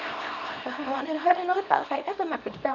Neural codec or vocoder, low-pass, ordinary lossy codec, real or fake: codec, 24 kHz, 0.9 kbps, WavTokenizer, small release; 7.2 kHz; none; fake